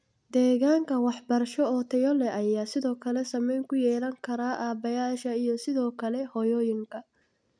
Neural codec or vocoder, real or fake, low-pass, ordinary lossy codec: none; real; 9.9 kHz; none